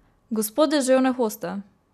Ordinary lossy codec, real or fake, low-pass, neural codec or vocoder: none; real; 14.4 kHz; none